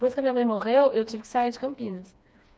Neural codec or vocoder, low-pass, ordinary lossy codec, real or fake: codec, 16 kHz, 2 kbps, FreqCodec, smaller model; none; none; fake